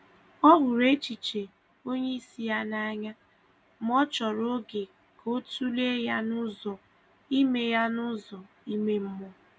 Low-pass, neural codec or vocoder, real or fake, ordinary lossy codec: none; none; real; none